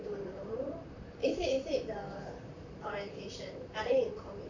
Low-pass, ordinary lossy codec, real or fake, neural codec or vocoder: 7.2 kHz; none; fake; vocoder, 44.1 kHz, 128 mel bands, Pupu-Vocoder